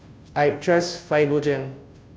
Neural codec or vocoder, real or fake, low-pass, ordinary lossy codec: codec, 16 kHz, 0.5 kbps, FunCodec, trained on Chinese and English, 25 frames a second; fake; none; none